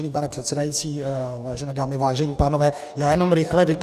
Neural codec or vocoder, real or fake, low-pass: codec, 44.1 kHz, 2.6 kbps, DAC; fake; 14.4 kHz